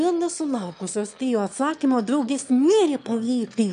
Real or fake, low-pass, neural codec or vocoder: fake; 9.9 kHz; autoencoder, 22.05 kHz, a latent of 192 numbers a frame, VITS, trained on one speaker